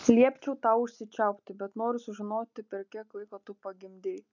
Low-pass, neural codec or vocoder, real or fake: 7.2 kHz; none; real